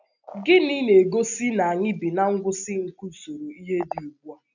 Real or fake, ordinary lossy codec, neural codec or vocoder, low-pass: real; none; none; 7.2 kHz